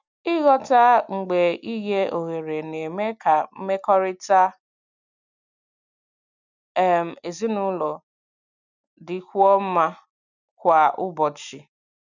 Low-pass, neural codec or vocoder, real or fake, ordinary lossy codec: 7.2 kHz; none; real; none